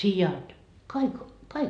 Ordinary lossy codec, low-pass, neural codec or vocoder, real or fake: none; 9.9 kHz; none; real